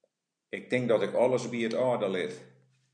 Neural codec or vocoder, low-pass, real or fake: none; 9.9 kHz; real